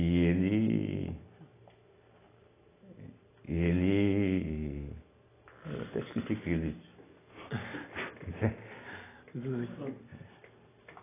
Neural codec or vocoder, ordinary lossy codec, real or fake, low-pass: none; AAC, 16 kbps; real; 3.6 kHz